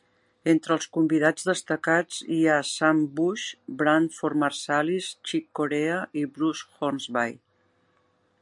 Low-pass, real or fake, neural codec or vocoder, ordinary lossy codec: 10.8 kHz; real; none; MP3, 64 kbps